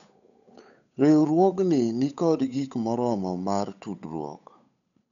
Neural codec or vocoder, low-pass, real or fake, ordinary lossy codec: codec, 16 kHz, 8 kbps, FunCodec, trained on Chinese and English, 25 frames a second; 7.2 kHz; fake; none